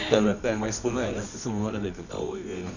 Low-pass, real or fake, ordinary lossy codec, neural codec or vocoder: 7.2 kHz; fake; none; codec, 24 kHz, 0.9 kbps, WavTokenizer, medium music audio release